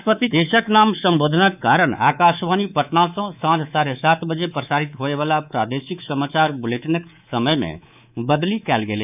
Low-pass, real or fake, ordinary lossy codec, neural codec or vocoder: 3.6 kHz; fake; none; codec, 24 kHz, 3.1 kbps, DualCodec